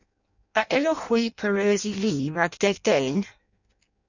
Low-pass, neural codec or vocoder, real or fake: 7.2 kHz; codec, 16 kHz in and 24 kHz out, 0.6 kbps, FireRedTTS-2 codec; fake